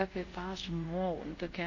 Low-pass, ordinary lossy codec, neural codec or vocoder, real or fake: 7.2 kHz; MP3, 32 kbps; codec, 24 kHz, 0.5 kbps, DualCodec; fake